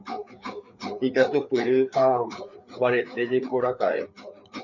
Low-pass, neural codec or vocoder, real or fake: 7.2 kHz; codec, 16 kHz, 4 kbps, FreqCodec, larger model; fake